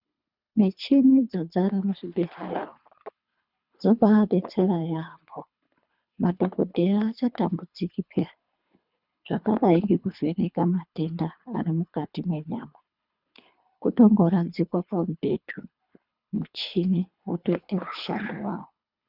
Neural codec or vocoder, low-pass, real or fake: codec, 24 kHz, 3 kbps, HILCodec; 5.4 kHz; fake